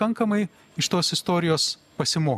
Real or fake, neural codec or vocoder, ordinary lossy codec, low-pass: real; none; MP3, 96 kbps; 14.4 kHz